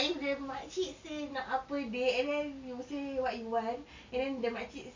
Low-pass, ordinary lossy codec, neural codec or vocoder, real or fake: 7.2 kHz; MP3, 48 kbps; none; real